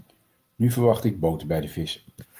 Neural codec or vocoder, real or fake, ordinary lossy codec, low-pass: none; real; Opus, 32 kbps; 14.4 kHz